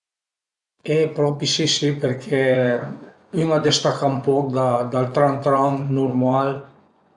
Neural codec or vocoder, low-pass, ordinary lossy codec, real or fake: vocoder, 24 kHz, 100 mel bands, Vocos; 10.8 kHz; none; fake